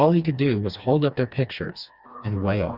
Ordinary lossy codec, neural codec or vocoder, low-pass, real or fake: Opus, 64 kbps; codec, 16 kHz, 2 kbps, FreqCodec, smaller model; 5.4 kHz; fake